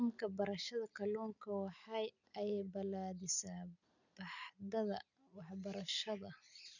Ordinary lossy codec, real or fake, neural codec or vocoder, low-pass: none; real; none; 7.2 kHz